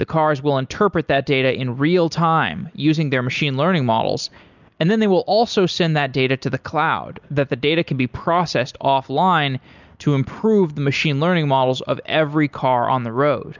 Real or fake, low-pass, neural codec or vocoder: real; 7.2 kHz; none